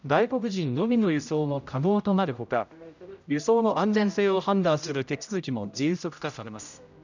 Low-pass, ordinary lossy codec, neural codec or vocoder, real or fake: 7.2 kHz; none; codec, 16 kHz, 0.5 kbps, X-Codec, HuBERT features, trained on general audio; fake